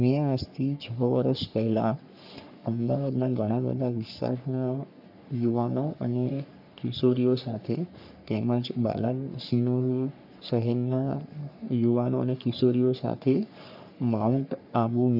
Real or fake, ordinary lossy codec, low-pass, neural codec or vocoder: fake; none; 5.4 kHz; codec, 44.1 kHz, 3.4 kbps, Pupu-Codec